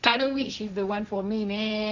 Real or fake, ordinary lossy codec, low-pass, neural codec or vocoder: fake; none; 7.2 kHz; codec, 16 kHz, 1.1 kbps, Voila-Tokenizer